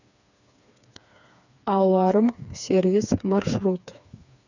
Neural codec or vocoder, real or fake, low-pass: codec, 16 kHz, 4 kbps, FreqCodec, smaller model; fake; 7.2 kHz